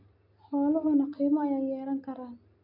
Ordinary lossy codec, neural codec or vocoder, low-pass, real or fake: none; none; 5.4 kHz; real